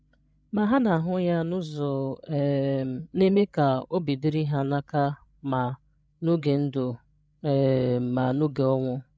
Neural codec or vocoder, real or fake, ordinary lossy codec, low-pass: codec, 16 kHz, 16 kbps, FreqCodec, larger model; fake; none; none